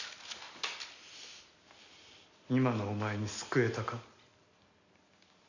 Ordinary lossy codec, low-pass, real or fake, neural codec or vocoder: none; 7.2 kHz; real; none